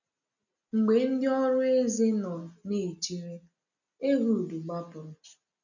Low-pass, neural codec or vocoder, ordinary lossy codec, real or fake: 7.2 kHz; none; none; real